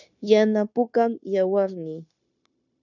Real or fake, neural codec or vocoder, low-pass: fake; codec, 16 kHz, 0.9 kbps, LongCat-Audio-Codec; 7.2 kHz